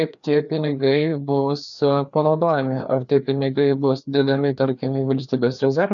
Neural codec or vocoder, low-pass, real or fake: codec, 16 kHz, 2 kbps, FreqCodec, larger model; 7.2 kHz; fake